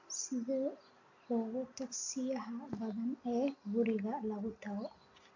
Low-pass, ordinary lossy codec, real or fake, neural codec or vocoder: 7.2 kHz; AAC, 48 kbps; real; none